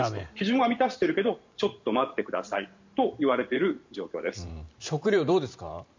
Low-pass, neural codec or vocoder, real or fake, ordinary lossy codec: 7.2 kHz; vocoder, 44.1 kHz, 128 mel bands every 512 samples, BigVGAN v2; fake; MP3, 64 kbps